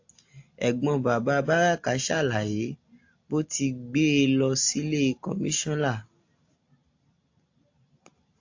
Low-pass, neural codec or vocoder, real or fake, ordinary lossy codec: 7.2 kHz; none; real; AAC, 48 kbps